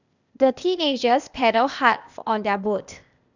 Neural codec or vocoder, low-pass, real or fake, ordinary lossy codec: codec, 16 kHz, 0.8 kbps, ZipCodec; 7.2 kHz; fake; none